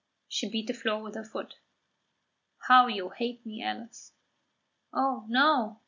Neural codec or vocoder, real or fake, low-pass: none; real; 7.2 kHz